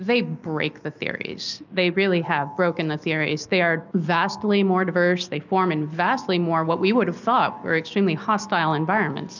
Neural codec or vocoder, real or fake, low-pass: none; real; 7.2 kHz